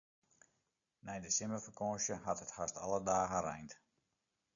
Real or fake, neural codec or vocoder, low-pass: real; none; 7.2 kHz